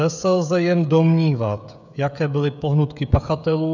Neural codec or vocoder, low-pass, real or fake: codec, 16 kHz, 16 kbps, FreqCodec, smaller model; 7.2 kHz; fake